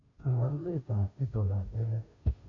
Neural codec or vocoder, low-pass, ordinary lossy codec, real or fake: codec, 16 kHz, 0.5 kbps, FunCodec, trained on Chinese and English, 25 frames a second; 7.2 kHz; none; fake